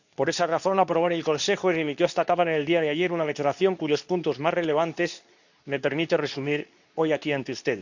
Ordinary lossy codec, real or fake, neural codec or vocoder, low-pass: none; fake; codec, 24 kHz, 0.9 kbps, WavTokenizer, medium speech release version 2; 7.2 kHz